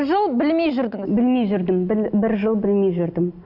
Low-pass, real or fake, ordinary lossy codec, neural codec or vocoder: 5.4 kHz; real; none; none